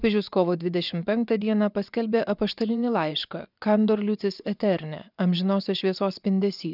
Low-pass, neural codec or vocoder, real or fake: 5.4 kHz; vocoder, 24 kHz, 100 mel bands, Vocos; fake